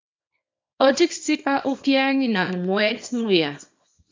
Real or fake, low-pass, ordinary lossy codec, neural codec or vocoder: fake; 7.2 kHz; MP3, 48 kbps; codec, 24 kHz, 0.9 kbps, WavTokenizer, small release